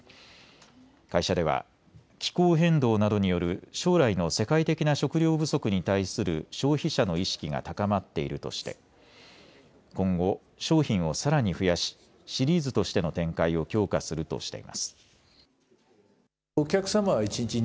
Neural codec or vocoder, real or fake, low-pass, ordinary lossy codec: none; real; none; none